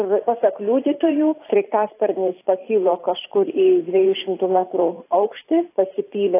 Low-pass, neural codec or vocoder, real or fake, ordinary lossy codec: 3.6 kHz; vocoder, 44.1 kHz, 128 mel bands every 512 samples, BigVGAN v2; fake; AAC, 24 kbps